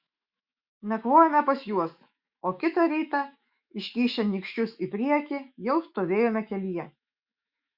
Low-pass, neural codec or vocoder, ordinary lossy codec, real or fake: 5.4 kHz; autoencoder, 48 kHz, 128 numbers a frame, DAC-VAE, trained on Japanese speech; Opus, 64 kbps; fake